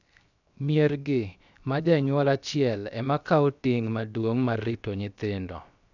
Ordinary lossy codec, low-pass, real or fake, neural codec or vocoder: none; 7.2 kHz; fake; codec, 16 kHz, 0.7 kbps, FocalCodec